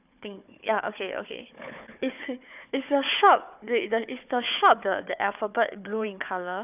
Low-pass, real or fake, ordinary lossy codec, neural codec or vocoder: 3.6 kHz; fake; none; codec, 16 kHz, 4 kbps, FunCodec, trained on Chinese and English, 50 frames a second